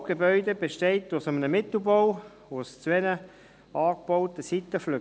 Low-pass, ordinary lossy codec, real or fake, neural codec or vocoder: none; none; real; none